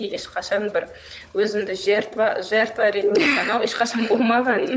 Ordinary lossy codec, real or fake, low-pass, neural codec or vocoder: none; fake; none; codec, 16 kHz, 16 kbps, FunCodec, trained on LibriTTS, 50 frames a second